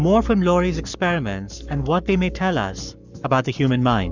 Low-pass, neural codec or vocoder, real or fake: 7.2 kHz; codec, 44.1 kHz, 7.8 kbps, Pupu-Codec; fake